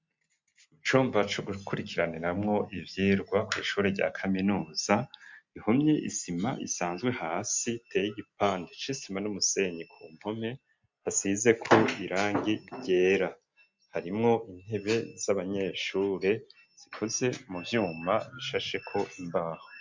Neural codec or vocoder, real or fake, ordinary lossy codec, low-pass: none; real; MP3, 64 kbps; 7.2 kHz